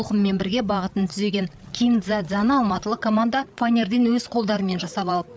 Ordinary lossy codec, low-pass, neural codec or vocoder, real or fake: none; none; codec, 16 kHz, 8 kbps, FreqCodec, larger model; fake